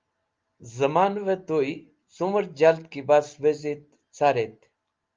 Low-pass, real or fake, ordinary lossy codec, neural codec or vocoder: 7.2 kHz; real; Opus, 32 kbps; none